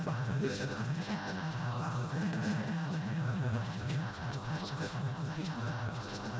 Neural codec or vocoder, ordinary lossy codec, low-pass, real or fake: codec, 16 kHz, 0.5 kbps, FreqCodec, smaller model; none; none; fake